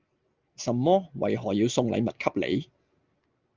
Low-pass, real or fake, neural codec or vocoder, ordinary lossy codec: 7.2 kHz; real; none; Opus, 24 kbps